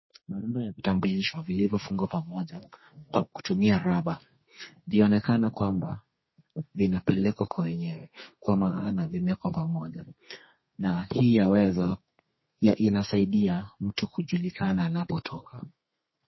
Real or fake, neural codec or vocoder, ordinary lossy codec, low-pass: fake; codec, 32 kHz, 1.9 kbps, SNAC; MP3, 24 kbps; 7.2 kHz